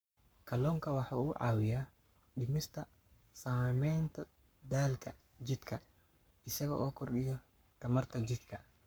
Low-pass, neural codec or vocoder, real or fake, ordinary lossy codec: none; codec, 44.1 kHz, 7.8 kbps, Pupu-Codec; fake; none